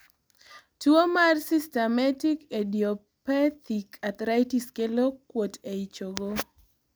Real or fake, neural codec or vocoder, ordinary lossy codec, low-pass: real; none; none; none